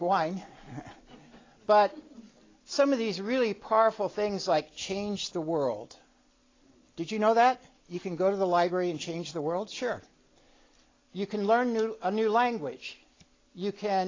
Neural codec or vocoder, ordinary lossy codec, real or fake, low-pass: none; AAC, 32 kbps; real; 7.2 kHz